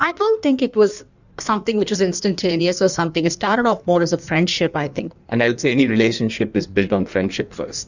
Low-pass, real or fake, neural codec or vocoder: 7.2 kHz; fake; codec, 16 kHz in and 24 kHz out, 1.1 kbps, FireRedTTS-2 codec